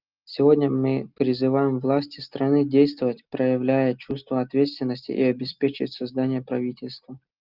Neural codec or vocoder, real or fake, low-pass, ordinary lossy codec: none; real; 5.4 kHz; Opus, 24 kbps